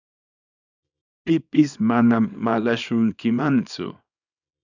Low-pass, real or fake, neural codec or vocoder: 7.2 kHz; fake; codec, 24 kHz, 0.9 kbps, WavTokenizer, small release